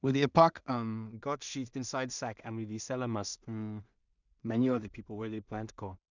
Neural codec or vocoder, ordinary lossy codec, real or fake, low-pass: codec, 16 kHz in and 24 kHz out, 0.4 kbps, LongCat-Audio-Codec, two codebook decoder; none; fake; 7.2 kHz